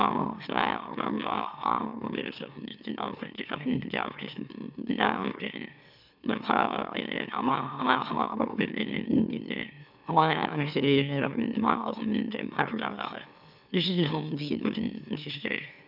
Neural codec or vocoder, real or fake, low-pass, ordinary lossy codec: autoencoder, 44.1 kHz, a latent of 192 numbers a frame, MeloTTS; fake; 5.4 kHz; none